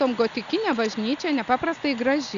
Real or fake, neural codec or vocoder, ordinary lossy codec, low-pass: real; none; Opus, 64 kbps; 7.2 kHz